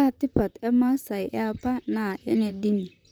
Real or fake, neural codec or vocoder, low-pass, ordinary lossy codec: fake; vocoder, 44.1 kHz, 128 mel bands, Pupu-Vocoder; none; none